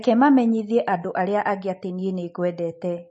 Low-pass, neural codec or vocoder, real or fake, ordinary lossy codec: 10.8 kHz; none; real; MP3, 32 kbps